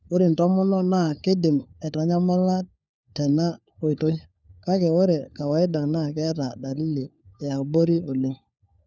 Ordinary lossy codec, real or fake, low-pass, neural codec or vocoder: none; fake; none; codec, 16 kHz, 4 kbps, FunCodec, trained on LibriTTS, 50 frames a second